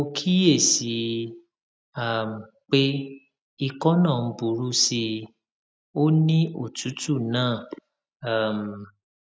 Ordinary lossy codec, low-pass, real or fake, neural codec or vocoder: none; none; real; none